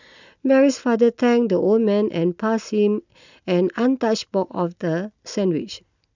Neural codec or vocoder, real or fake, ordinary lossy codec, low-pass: none; real; none; 7.2 kHz